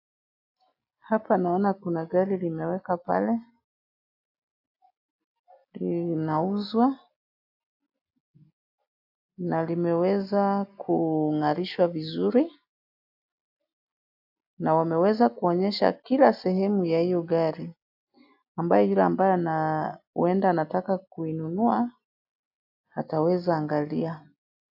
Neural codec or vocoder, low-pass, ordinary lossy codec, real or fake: none; 5.4 kHz; AAC, 48 kbps; real